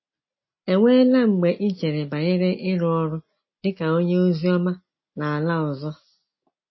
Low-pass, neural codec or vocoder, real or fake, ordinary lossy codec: 7.2 kHz; none; real; MP3, 24 kbps